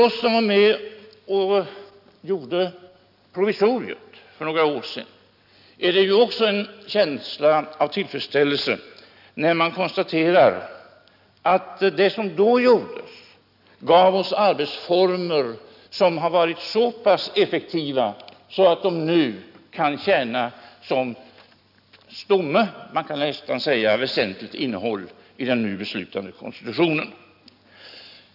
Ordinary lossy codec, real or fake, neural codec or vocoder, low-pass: none; real; none; 5.4 kHz